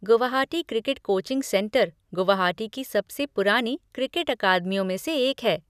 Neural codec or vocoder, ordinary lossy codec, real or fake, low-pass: none; none; real; 14.4 kHz